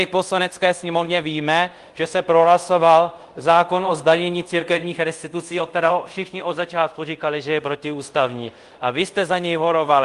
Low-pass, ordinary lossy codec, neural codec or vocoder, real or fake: 10.8 kHz; Opus, 24 kbps; codec, 24 kHz, 0.5 kbps, DualCodec; fake